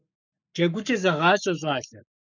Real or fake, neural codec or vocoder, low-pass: fake; codec, 44.1 kHz, 7.8 kbps, Pupu-Codec; 7.2 kHz